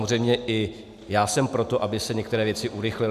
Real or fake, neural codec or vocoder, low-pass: real; none; 14.4 kHz